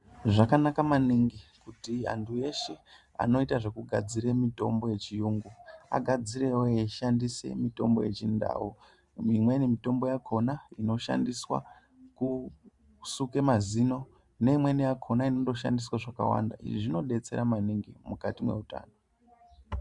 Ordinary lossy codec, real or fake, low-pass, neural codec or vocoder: Opus, 64 kbps; fake; 10.8 kHz; vocoder, 44.1 kHz, 128 mel bands every 512 samples, BigVGAN v2